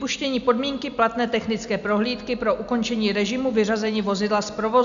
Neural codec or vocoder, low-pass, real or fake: none; 7.2 kHz; real